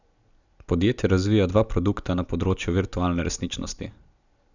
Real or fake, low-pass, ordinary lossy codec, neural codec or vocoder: real; 7.2 kHz; none; none